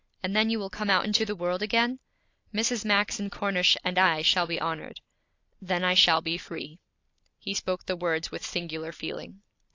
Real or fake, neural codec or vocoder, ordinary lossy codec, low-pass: real; none; AAC, 48 kbps; 7.2 kHz